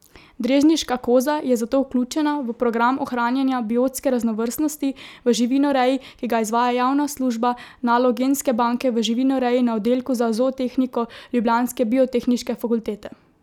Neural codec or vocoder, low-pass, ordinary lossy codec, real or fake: none; 19.8 kHz; none; real